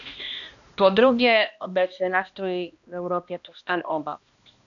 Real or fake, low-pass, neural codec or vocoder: fake; 7.2 kHz; codec, 16 kHz, 1 kbps, X-Codec, HuBERT features, trained on balanced general audio